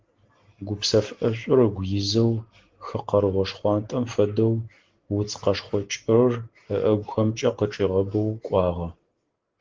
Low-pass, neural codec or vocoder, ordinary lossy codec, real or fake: 7.2 kHz; none; Opus, 16 kbps; real